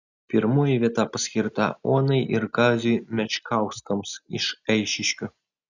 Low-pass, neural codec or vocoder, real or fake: 7.2 kHz; none; real